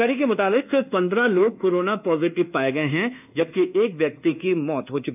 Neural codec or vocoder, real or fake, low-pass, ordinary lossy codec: codec, 24 kHz, 1.2 kbps, DualCodec; fake; 3.6 kHz; none